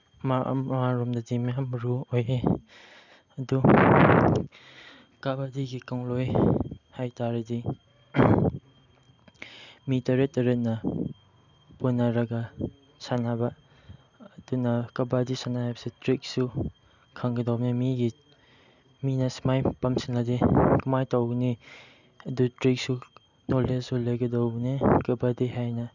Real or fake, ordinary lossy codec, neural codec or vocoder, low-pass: real; none; none; 7.2 kHz